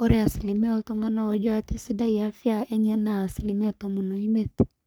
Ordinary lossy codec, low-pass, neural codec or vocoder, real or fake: none; none; codec, 44.1 kHz, 3.4 kbps, Pupu-Codec; fake